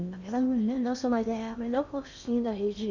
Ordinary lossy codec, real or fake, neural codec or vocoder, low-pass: none; fake; codec, 16 kHz in and 24 kHz out, 0.8 kbps, FocalCodec, streaming, 65536 codes; 7.2 kHz